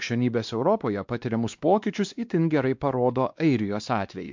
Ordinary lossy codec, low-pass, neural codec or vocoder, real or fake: MP3, 64 kbps; 7.2 kHz; codec, 16 kHz, 2 kbps, X-Codec, WavLM features, trained on Multilingual LibriSpeech; fake